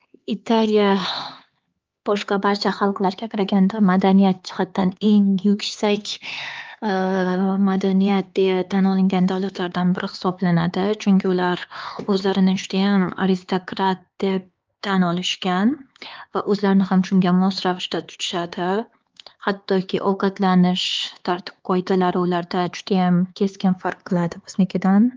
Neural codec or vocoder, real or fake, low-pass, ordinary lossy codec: codec, 16 kHz, 4 kbps, X-Codec, HuBERT features, trained on LibriSpeech; fake; 7.2 kHz; Opus, 24 kbps